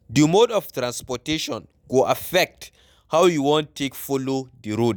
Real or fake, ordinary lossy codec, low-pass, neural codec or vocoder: real; none; none; none